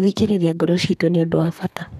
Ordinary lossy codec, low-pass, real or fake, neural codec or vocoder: none; 14.4 kHz; fake; codec, 32 kHz, 1.9 kbps, SNAC